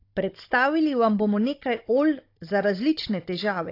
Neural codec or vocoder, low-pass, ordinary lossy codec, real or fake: codec, 16 kHz, 16 kbps, FunCodec, trained on Chinese and English, 50 frames a second; 5.4 kHz; AAC, 32 kbps; fake